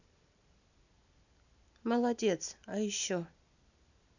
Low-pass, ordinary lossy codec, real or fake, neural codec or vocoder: 7.2 kHz; none; real; none